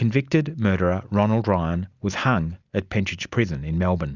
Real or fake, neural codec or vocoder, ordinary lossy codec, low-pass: real; none; Opus, 64 kbps; 7.2 kHz